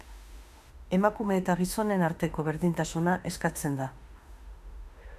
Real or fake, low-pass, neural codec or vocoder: fake; 14.4 kHz; autoencoder, 48 kHz, 32 numbers a frame, DAC-VAE, trained on Japanese speech